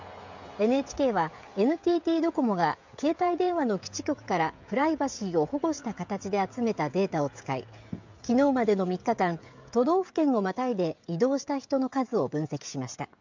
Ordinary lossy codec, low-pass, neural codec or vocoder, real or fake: MP3, 64 kbps; 7.2 kHz; codec, 16 kHz, 16 kbps, FreqCodec, smaller model; fake